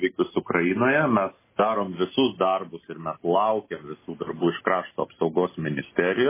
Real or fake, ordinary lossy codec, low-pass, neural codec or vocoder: real; MP3, 16 kbps; 3.6 kHz; none